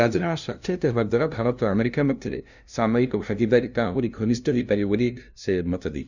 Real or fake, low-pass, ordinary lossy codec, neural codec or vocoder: fake; 7.2 kHz; none; codec, 16 kHz, 0.5 kbps, FunCodec, trained on LibriTTS, 25 frames a second